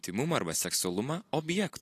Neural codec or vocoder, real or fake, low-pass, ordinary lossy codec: none; real; 14.4 kHz; AAC, 48 kbps